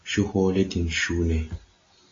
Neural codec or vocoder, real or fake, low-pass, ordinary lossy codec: none; real; 7.2 kHz; AAC, 32 kbps